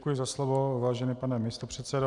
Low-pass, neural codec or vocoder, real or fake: 10.8 kHz; none; real